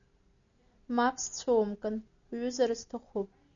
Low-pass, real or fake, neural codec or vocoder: 7.2 kHz; real; none